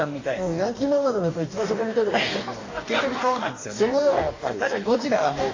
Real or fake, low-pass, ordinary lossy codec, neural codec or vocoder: fake; 7.2 kHz; AAC, 32 kbps; codec, 44.1 kHz, 2.6 kbps, DAC